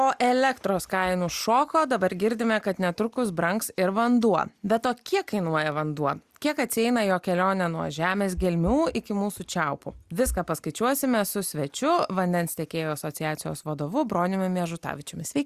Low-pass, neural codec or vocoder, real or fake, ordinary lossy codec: 14.4 kHz; none; real; Opus, 64 kbps